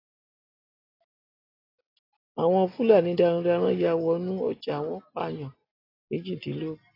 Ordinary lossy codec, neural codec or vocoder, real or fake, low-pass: AAC, 24 kbps; none; real; 5.4 kHz